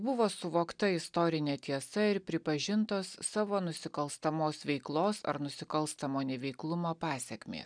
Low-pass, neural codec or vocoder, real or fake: 9.9 kHz; none; real